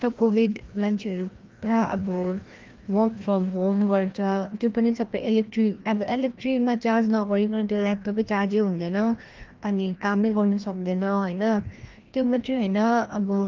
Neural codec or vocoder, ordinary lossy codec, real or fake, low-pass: codec, 16 kHz, 1 kbps, FreqCodec, larger model; Opus, 32 kbps; fake; 7.2 kHz